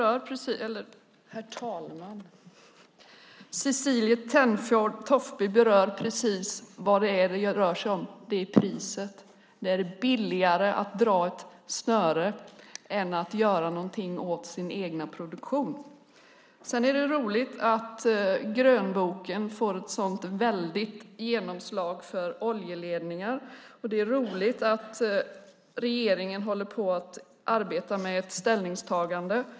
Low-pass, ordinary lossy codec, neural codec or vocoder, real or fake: none; none; none; real